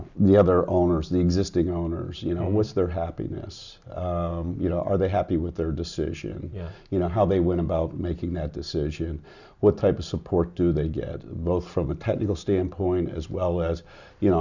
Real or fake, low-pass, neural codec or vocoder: real; 7.2 kHz; none